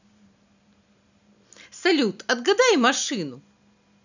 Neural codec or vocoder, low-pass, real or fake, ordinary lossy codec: none; 7.2 kHz; real; none